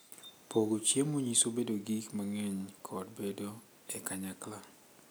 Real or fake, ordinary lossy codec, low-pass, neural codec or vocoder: real; none; none; none